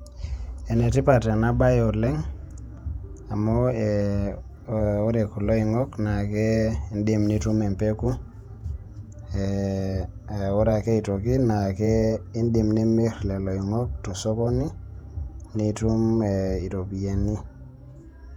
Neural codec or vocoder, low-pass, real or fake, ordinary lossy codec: vocoder, 48 kHz, 128 mel bands, Vocos; 19.8 kHz; fake; none